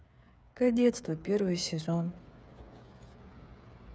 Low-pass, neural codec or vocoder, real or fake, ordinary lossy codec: none; codec, 16 kHz, 8 kbps, FreqCodec, smaller model; fake; none